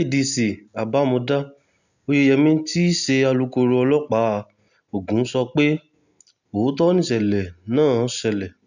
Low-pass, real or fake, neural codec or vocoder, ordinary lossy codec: 7.2 kHz; real; none; none